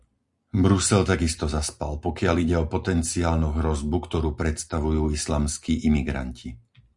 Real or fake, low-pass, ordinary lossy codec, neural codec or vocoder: real; 10.8 kHz; Opus, 64 kbps; none